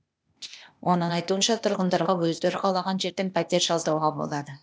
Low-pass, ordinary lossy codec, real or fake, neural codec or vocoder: none; none; fake; codec, 16 kHz, 0.8 kbps, ZipCodec